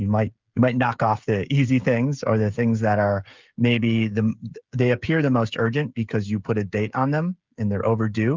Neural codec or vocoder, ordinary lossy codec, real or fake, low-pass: codec, 16 kHz, 16 kbps, FreqCodec, smaller model; Opus, 24 kbps; fake; 7.2 kHz